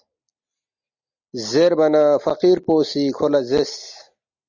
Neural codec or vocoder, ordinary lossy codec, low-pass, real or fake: none; Opus, 64 kbps; 7.2 kHz; real